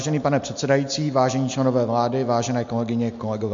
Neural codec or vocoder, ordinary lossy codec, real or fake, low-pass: none; MP3, 48 kbps; real; 7.2 kHz